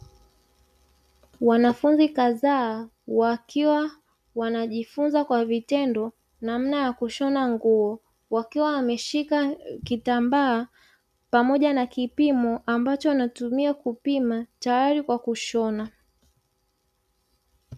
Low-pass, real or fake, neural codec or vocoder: 14.4 kHz; real; none